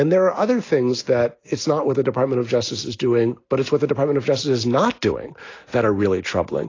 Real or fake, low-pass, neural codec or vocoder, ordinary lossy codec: real; 7.2 kHz; none; AAC, 32 kbps